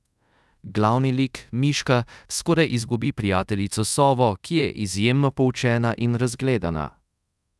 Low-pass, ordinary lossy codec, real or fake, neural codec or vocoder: none; none; fake; codec, 24 kHz, 0.5 kbps, DualCodec